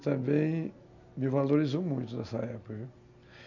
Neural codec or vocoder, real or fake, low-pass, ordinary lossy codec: none; real; 7.2 kHz; none